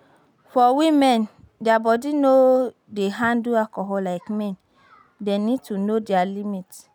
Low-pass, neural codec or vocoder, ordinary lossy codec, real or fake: 19.8 kHz; none; none; real